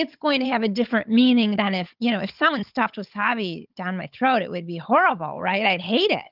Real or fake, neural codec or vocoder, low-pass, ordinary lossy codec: fake; codec, 16 kHz, 16 kbps, FunCodec, trained on Chinese and English, 50 frames a second; 5.4 kHz; Opus, 32 kbps